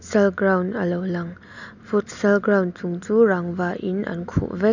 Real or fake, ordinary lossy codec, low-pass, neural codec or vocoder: real; none; 7.2 kHz; none